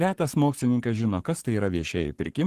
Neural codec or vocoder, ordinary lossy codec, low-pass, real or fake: codec, 44.1 kHz, 7.8 kbps, Pupu-Codec; Opus, 16 kbps; 14.4 kHz; fake